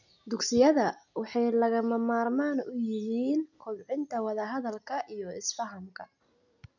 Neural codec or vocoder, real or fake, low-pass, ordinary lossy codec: none; real; 7.2 kHz; none